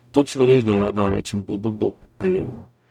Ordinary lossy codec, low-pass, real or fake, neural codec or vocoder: none; 19.8 kHz; fake; codec, 44.1 kHz, 0.9 kbps, DAC